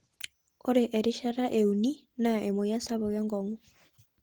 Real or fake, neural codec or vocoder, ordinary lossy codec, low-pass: real; none; Opus, 16 kbps; 19.8 kHz